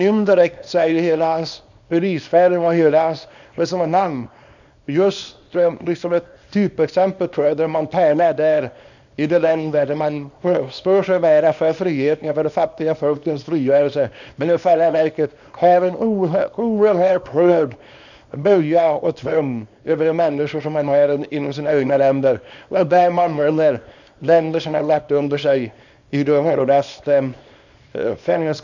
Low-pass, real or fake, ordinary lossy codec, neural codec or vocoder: 7.2 kHz; fake; none; codec, 24 kHz, 0.9 kbps, WavTokenizer, small release